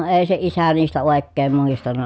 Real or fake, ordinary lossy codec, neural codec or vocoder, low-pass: real; none; none; none